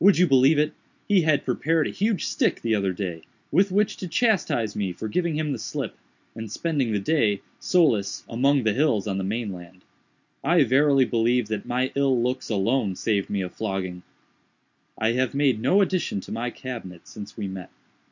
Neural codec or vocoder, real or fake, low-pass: none; real; 7.2 kHz